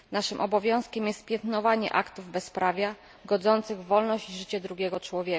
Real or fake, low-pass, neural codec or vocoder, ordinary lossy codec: real; none; none; none